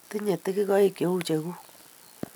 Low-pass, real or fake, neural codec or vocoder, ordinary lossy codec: none; real; none; none